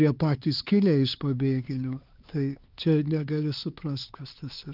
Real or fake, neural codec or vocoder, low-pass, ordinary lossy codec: fake; codec, 16 kHz, 4 kbps, X-Codec, HuBERT features, trained on LibriSpeech; 5.4 kHz; Opus, 32 kbps